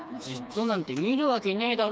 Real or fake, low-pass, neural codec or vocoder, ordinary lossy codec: fake; none; codec, 16 kHz, 2 kbps, FreqCodec, smaller model; none